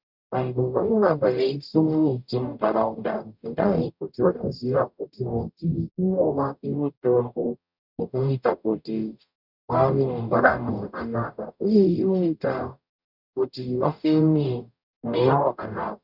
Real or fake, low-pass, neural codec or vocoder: fake; 5.4 kHz; codec, 44.1 kHz, 0.9 kbps, DAC